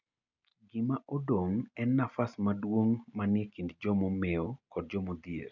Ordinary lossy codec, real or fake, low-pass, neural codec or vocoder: none; real; 7.2 kHz; none